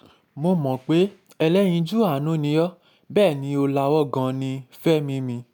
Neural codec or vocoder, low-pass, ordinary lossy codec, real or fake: none; none; none; real